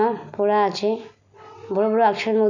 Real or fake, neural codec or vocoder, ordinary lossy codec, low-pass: real; none; none; 7.2 kHz